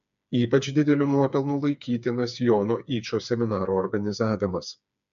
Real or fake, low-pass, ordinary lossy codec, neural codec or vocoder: fake; 7.2 kHz; MP3, 64 kbps; codec, 16 kHz, 4 kbps, FreqCodec, smaller model